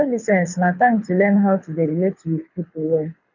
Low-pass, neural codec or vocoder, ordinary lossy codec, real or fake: 7.2 kHz; codec, 24 kHz, 6 kbps, HILCodec; none; fake